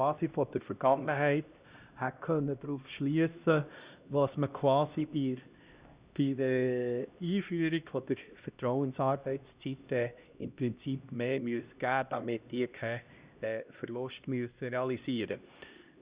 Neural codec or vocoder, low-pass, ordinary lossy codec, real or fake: codec, 16 kHz, 1 kbps, X-Codec, HuBERT features, trained on LibriSpeech; 3.6 kHz; Opus, 64 kbps; fake